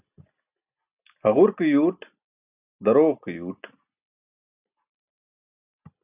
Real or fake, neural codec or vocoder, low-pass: real; none; 3.6 kHz